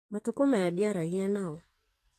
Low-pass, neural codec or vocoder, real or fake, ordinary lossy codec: 14.4 kHz; codec, 44.1 kHz, 2.6 kbps, SNAC; fake; AAC, 64 kbps